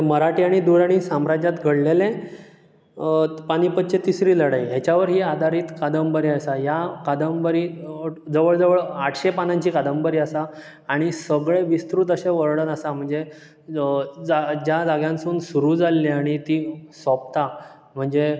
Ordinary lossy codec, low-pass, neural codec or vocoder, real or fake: none; none; none; real